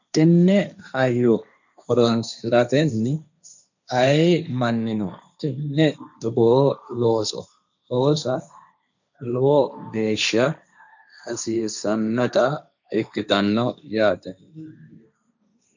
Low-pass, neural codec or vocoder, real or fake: 7.2 kHz; codec, 16 kHz, 1.1 kbps, Voila-Tokenizer; fake